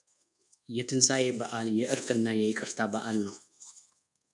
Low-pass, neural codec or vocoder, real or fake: 10.8 kHz; codec, 24 kHz, 1.2 kbps, DualCodec; fake